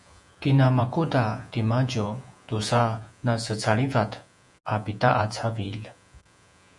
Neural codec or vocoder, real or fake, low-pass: vocoder, 48 kHz, 128 mel bands, Vocos; fake; 10.8 kHz